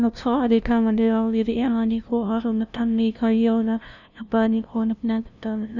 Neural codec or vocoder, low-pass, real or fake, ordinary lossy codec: codec, 16 kHz, 0.5 kbps, FunCodec, trained on LibriTTS, 25 frames a second; 7.2 kHz; fake; none